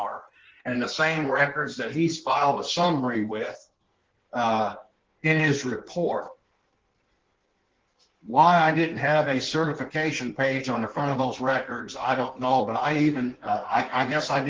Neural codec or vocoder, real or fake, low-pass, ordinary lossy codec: codec, 16 kHz in and 24 kHz out, 1.1 kbps, FireRedTTS-2 codec; fake; 7.2 kHz; Opus, 16 kbps